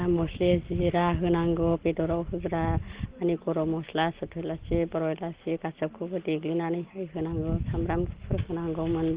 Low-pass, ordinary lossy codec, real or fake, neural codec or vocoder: 3.6 kHz; Opus, 16 kbps; real; none